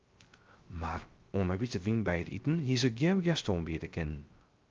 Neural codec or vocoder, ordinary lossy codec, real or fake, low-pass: codec, 16 kHz, 0.3 kbps, FocalCodec; Opus, 24 kbps; fake; 7.2 kHz